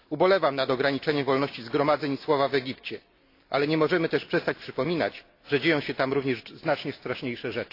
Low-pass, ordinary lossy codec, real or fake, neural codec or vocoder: 5.4 kHz; AAC, 32 kbps; real; none